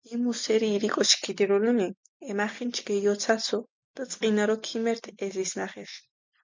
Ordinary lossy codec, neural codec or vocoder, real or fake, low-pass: MP3, 64 kbps; none; real; 7.2 kHz